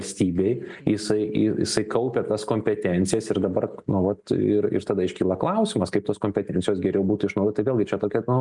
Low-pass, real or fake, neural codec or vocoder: 10.8 kHz; real; none